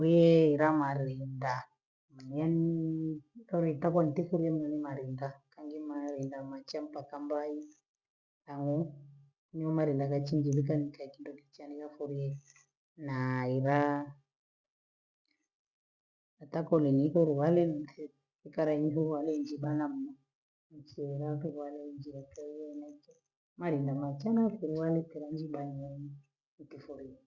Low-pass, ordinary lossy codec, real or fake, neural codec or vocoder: 7.2 kHz; Opus, 64 kbps; real; none